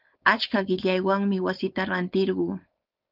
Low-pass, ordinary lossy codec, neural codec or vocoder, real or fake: 5.4 kHz; Opus, 32 kbps; codec, 16 kHz, 4.8 kbps, FACodec; fake